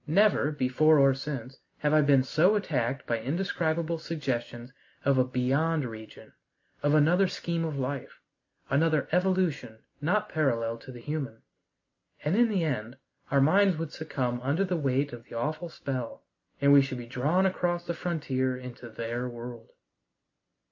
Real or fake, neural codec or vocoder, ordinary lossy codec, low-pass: real; none; MP3, 64 kbps; 7.2 kHz